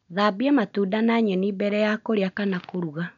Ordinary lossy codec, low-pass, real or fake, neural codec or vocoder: none; 7.2 kHz; real; none